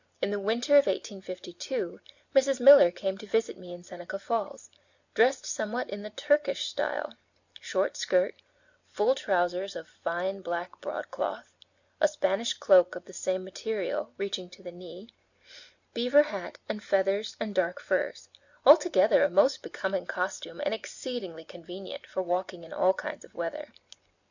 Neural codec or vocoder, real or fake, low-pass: none; real; 7.2 kHz